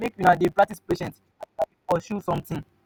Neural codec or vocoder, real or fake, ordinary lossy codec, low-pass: none; real; none; none